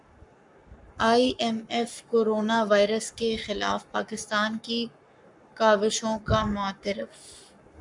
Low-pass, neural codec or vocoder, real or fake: 10.8 kHz; codec, 44.1 kHz, 7.8 kbps, Pupu-Codec; fake